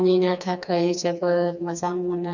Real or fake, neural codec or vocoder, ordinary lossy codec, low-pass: fake; codec, 16 kHz, 2 kbps, FreqCodec, smaller model; none; 7.2 kHz